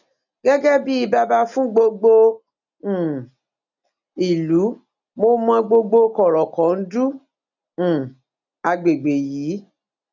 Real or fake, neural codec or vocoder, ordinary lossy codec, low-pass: real; none; none; 7.2 kHz